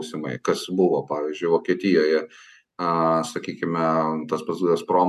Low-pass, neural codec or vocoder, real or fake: 14.4 kHz; none; real